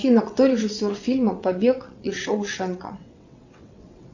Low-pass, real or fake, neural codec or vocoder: 7.2 kHz; fake; vocoder, 22.05 kHz, 80 mel bands, WaveNeXt